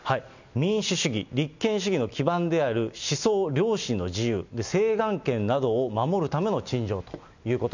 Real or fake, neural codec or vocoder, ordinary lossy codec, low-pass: real; none; none; 7.2 kHz